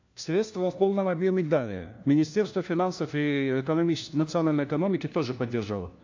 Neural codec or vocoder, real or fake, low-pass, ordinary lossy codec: codec, 16 kHz, 1 kbps, FunCodec, trained on LibriTTS, 50 frames a second; fake; 7.2 kHz; AAC, 48 kbps